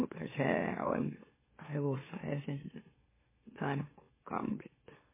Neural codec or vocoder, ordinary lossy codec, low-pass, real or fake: autoencoder, 44.1 kHz, a latent of 192 numbers a frame, MeloTTS; MP3, 16 kbps; 3.6 kHz; fake